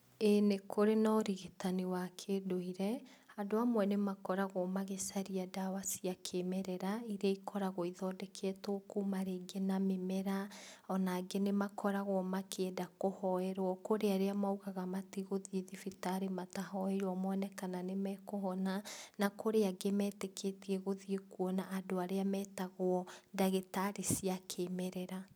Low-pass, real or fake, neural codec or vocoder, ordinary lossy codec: none; real; none; none